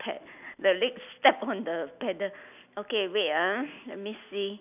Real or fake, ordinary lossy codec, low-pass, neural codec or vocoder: real; none; 3.6 kHz; none